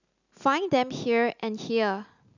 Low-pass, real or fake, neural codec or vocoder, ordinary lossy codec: 7.2 kHz; real; none; none